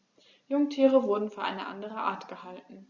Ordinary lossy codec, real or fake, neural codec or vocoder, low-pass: Opus, 64 kbps; real; none; 7.2 kHz